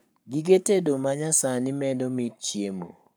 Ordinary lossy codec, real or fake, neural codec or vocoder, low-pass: none; fake; codec, 44.1 kHz, 7.8 kbps, Pupu-Codec; none